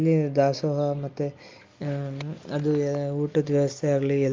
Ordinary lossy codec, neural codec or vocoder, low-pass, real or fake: Opus, 24 kbps; none; 7.2 kHz; real